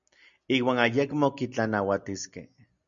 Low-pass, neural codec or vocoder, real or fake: 7.2 kHz; none; real